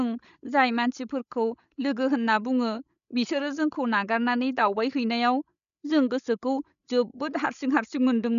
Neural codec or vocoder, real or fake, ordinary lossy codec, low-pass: codec, 16 kHz, 16 kbps, FreqCodec, larger model; fake; none; 7.2 kHz